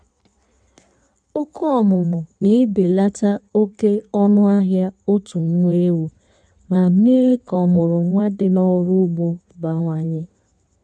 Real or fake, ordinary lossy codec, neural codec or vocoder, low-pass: fake; none; codec, 16 kHz in and 24 kHz out, 1.1 kbps, FireRedTTS-2 codec; 9.9 kHz